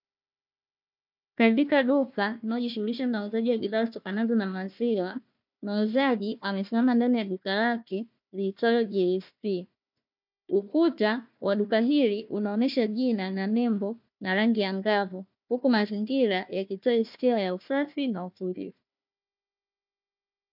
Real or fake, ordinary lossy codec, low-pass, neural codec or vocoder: fake; MP3, 48 kbps; 5.4 kHz; codec, 16 kHz, 1 kbps, FunCodec, trained on Chinese and English, 50 frames a second